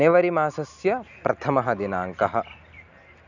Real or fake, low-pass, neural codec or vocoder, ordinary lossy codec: real; 7.2 kHz; none; none